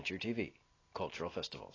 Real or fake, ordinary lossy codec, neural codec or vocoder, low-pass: real; AAC, 32 kbps; none; 7.2 kHz